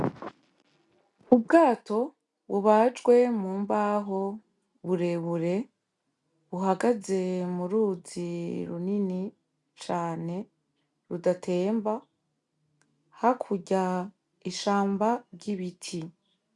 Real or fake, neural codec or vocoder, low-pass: real; none; 10.8 kHz